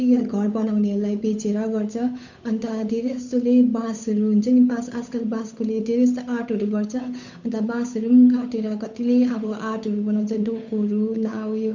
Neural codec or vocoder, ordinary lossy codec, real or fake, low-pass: codec, 16 kHz, 8 kbps, FunCodec, trained on Chinese and English, 25 frames a second; none; fake; 7.2 kHz